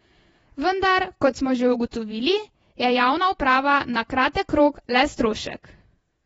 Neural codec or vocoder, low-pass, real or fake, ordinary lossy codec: vocoder, 48 kHz, 128 mel bands, Vocos; 19.8 kHz; fake; AAC, 24 kbps